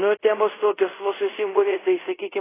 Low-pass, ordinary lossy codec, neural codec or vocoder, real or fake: 3.6 kHz; AAC, 16 kbps; codec, 24 kHz, 0.5 kbps, DualCodec; fake